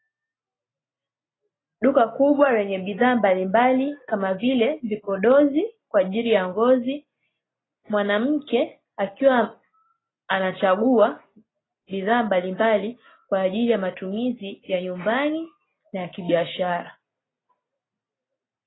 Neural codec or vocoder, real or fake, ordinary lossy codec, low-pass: none; real; AAC, 16 kbps; 7.2 kHz